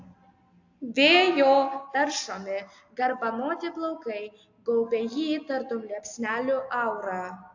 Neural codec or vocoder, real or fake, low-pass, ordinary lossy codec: none; real; 7.2 kHz; AAC, 48 kbps